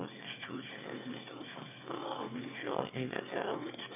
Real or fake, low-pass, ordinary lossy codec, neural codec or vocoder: fake; 3.6 kHz; none; autoencoder, 22.05 kHz, a latent of 192 numbers a frame, VITS, trained on one speaker